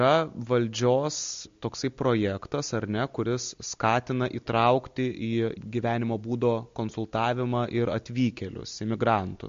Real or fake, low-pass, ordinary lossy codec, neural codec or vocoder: real; 7.2 kHz; MP3, 48 kbps; none